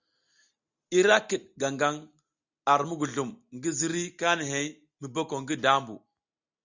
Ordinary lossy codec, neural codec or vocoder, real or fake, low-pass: Opus, 64 kbps; none; real; 7.2 kHz